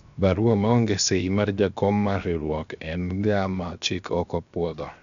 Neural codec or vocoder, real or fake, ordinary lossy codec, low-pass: codec, 16 kHz, 0.7 kbps, FocalCodec; fake; none; 7.2 kHz